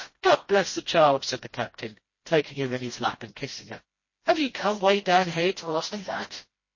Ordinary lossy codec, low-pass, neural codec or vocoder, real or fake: MP3, 32 kbps; 7.2 kHz; codec, 16 kHz, 1 kbps, FreqCodec, smaller model; fake